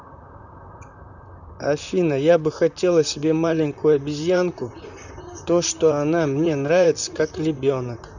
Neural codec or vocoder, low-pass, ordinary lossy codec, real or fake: vocoder, 44.1 kHz, 128 mel bands, Pupu-Vocoder; 7.2 kHz; none; fake